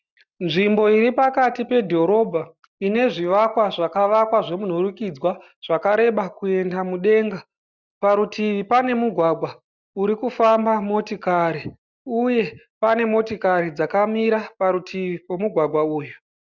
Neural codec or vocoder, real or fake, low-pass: none; real; 7.2 kHz